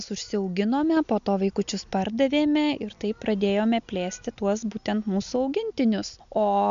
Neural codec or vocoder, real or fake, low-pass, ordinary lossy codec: none; real; 7.2 kHz; MP3, 64 kbps